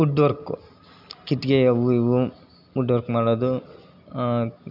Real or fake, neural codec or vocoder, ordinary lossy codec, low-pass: real; none; none; 5.4 kHz